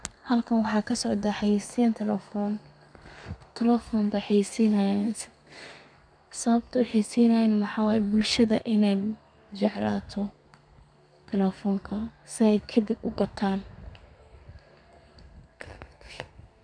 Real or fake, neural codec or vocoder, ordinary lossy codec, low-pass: fake; codec, 32 kHz, 1.9 kbps, SNAC; none; 9.9 kHz